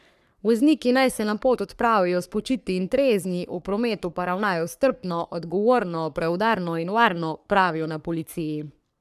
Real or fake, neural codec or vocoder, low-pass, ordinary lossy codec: fake; codec, 44.1 kHz, 3.4 kbps, Pupu-Codec; 14.4 kHz; none